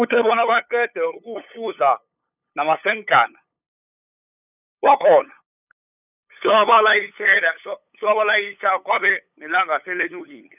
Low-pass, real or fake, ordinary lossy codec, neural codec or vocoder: 3.6 kHz; fake; none; codec, 16 kHz, 8 kbps, FunCodec, trained on LibriTTS, 25 frames a second